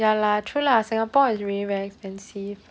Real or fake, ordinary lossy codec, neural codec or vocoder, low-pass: real; none; none; none